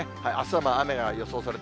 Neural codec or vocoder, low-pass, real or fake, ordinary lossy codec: none; none; real; none